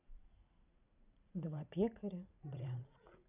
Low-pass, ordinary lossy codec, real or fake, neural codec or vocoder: 3.6 kHz; none; fake; vocoder, 44.1 kHz, 80 mel bands, Vocos